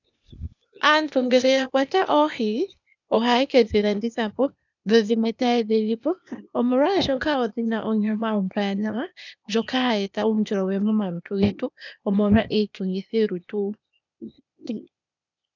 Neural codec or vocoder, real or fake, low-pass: codec, 16 kHz, 0.8 kbps, ZipCodec; fake; 7.2 kHz